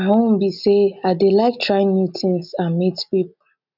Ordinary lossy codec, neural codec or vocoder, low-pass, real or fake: none; none; 5.4 kHz; real